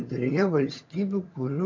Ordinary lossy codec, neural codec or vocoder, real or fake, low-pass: MP3, 48 kbps; vocoder, 22.05 kHz, 80 mel bands, HiFi-GAN; fake; 7.2 kHz